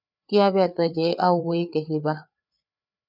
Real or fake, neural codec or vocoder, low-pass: fake; codec, 16 kHz, 4 kbps, FreqCodec, larger model; 5.4 kHz